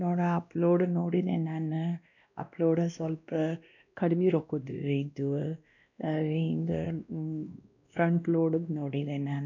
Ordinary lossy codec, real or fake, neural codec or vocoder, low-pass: none; fake; codec, 16 kHz, 1 kbps, X-Codec, WavLM features, trained on Multilingual LibriSpeech; 7.2 kHz